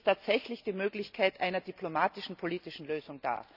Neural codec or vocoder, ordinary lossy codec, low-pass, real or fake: none; none; 5.4 kHz; real